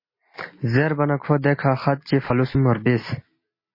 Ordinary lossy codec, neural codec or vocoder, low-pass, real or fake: MP3, 24 kbps; none; 5.4 kHz; real